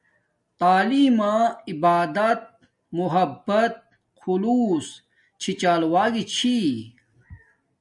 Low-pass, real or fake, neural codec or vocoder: 10.8 kHz; real; none